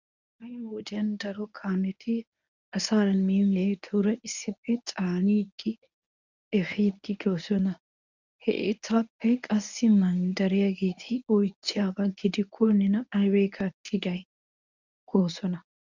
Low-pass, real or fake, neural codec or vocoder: 7.2 kHz; fake; codec, 24 kHz, 0.9 kbps, WavTokenizer, medium speech release version 1